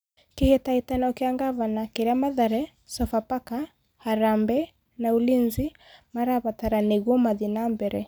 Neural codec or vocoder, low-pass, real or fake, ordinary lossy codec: none; none; real; none